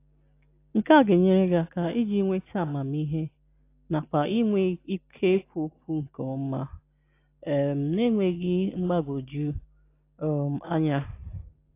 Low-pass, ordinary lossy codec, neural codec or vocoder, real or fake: 3.6 kHz; AAC, 24 kbps; none; real